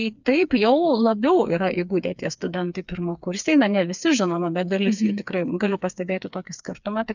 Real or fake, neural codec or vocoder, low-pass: fake; codec, 16 kHz, 4 kbps, FreqCodec, smaller model; 7.2 kHz